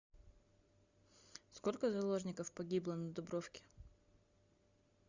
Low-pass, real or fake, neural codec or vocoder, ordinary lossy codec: 7.2 kHz; real; none; Opus, 64 kbps